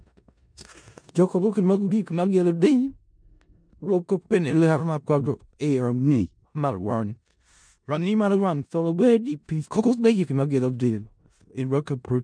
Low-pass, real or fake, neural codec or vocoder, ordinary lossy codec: 9.9 kHz; fake; codec, 16 kHz in and 24 kHz out, 0.4 kbps, LongCat-Audio-Codec, four codebook decoder; MP3, 64 kbps